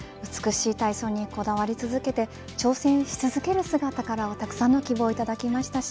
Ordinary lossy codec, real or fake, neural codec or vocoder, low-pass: none; real; none; none